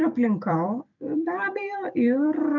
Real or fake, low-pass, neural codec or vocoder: fake; 7.2 kHz; vocoder, 44.1 kHz, 128 mel bands every 256 samples, BigVGAN v2